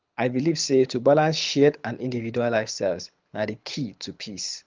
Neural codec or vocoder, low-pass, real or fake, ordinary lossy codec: codec, 24 kHz, 6 kbps, HILCodec; 7.2 kHz; fake; Opus, 32 kbps